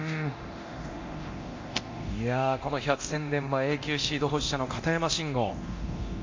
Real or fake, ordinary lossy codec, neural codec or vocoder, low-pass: fake; MP3, 48 kbps; codec, 24 kHz, 0.9 kbps, DualCodec; 7.2 kHz